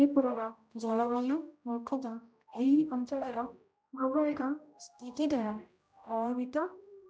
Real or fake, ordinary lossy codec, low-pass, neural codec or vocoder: fake; none; none; codec, 16 kHz, 0.5 kbps, X-Codec, HuBERT features, trained on general audio